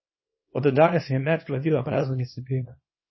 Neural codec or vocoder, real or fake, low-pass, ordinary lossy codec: codec, 24 kHz, 0.9 kbps, WavTokenizer, small release; fake; 7.2 kHz; MP3, 24 kbps